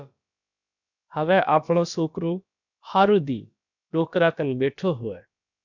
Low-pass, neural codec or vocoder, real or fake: 7.2 kHz; codec, 16 kHz, about 1 kbps, DyCAST, with the encoder's durations; fake